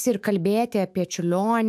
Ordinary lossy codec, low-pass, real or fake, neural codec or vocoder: AAC, 96 kbps; 14.4 kHz; fake; autoencoder, 48 kHz, 128 numbers a frame, DAC-VAE, trained on Japanese speech